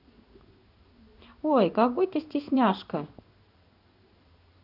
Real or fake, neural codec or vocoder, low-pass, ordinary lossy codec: fake; codec, 16 kHz in and 24 kHz out, 1 kbps, XY-Tokenizer; 5.4 kHz; none